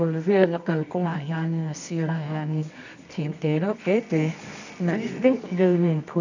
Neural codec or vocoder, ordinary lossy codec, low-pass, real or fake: codec, 24 kHz, 0.9 kbps, WavTokenizer, medium music audio release; none; 7.2 kHz; fake